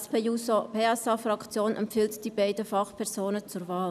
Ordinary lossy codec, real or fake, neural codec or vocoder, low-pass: none; real; none; 14.4 kHz